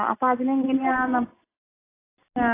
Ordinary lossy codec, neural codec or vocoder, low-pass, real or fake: AAC, 24 kbps; none; 3.6 kHz; real